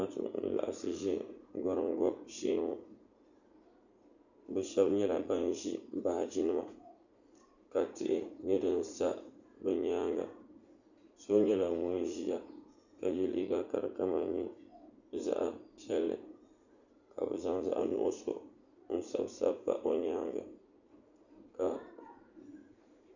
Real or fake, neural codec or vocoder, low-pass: fake; vocoder, 44.1 kHz, 80 mel bands, Vocos; 7.2 kHz